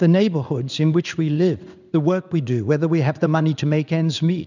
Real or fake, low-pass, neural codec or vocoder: fake; 7.2 kHz; codec, 16 kHz in and 24 kHz out, 1 kbps, XY-Tokenizer